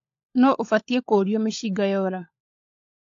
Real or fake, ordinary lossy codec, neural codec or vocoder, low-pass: fake; AAC, 48 kbps; codec, 16 kHz, 16 kbps, FunCodec, trained on LibriTTS, 50 frames a second; 7.2 kHz